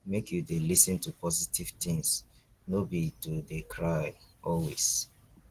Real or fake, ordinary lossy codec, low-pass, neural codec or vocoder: real; Opus, 16 kbps; 14.4 kHz; none